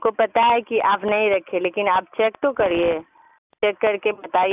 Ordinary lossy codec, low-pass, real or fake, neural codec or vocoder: none; 3.6 kHz; real; none